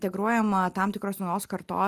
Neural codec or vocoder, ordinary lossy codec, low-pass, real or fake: none; Opus, 32 kbps; 14.4 kHz; real